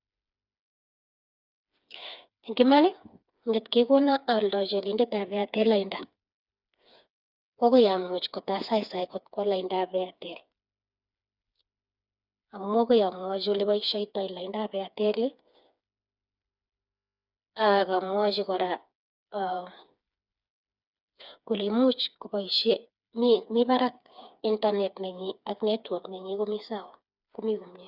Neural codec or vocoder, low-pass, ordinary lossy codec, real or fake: codec, 16 kHz, 4 kbps, FreqCodec, smaller model; 5.4 kHz; Opus, 64 kbps; fake